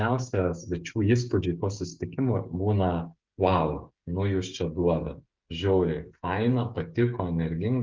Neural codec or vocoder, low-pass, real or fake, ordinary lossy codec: codec, 16 kHz, 8 kbps, FreqCodec, smaller model; 7.2 kHz; fake; Opus, 24 kbps